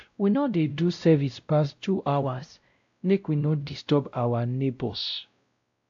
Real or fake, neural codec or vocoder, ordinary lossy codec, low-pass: fake; codec, 16 kHz, 0.5 kbps, X-Codec, WavLM features, trained on Multilingual LibriSpeech; AAC, 64 kbps; 7.2 kHz